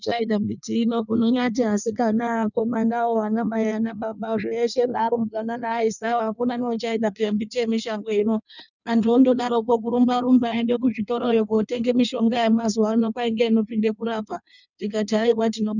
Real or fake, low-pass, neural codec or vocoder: fake; 7.2 kHz; codec, 16 kHz in and 24 kHz out, 1.1 kbps, FireRedTTS-2 codec